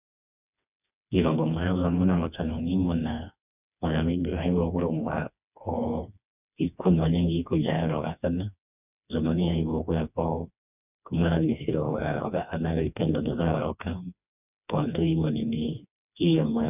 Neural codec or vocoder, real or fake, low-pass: codec, 16 kHz, 2 kbps, FreqCodec, smaller model; fake; 3.6 kHz